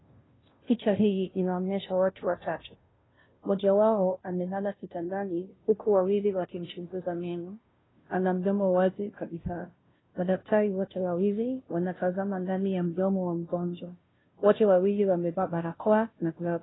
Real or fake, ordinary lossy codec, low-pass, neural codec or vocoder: fake; AAC, 16 kbps; 7.2 kHz; codec, 16 kHz, 0.5 kbps, FunCodec, trained on Chinese and English, 25 frames a second